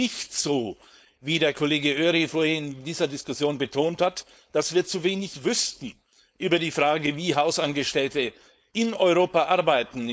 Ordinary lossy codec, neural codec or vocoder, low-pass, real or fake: none; codec, 16 kHz, 4.8 kbps, FACodec; none; fake